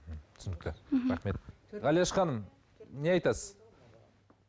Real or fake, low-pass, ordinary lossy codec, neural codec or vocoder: real; none; none; none